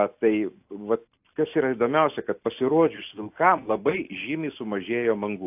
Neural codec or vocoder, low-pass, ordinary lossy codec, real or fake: none; 3.6 kHz; AAC, 32 kbps; real